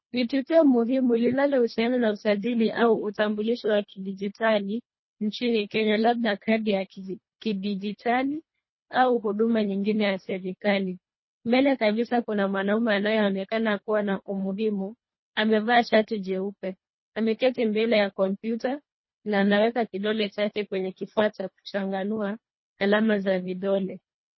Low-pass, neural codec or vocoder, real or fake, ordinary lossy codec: 7.2 kHz; codec, 24 kHz, 1.5 kbps, HILCodec; fake; MP3, 24 kbps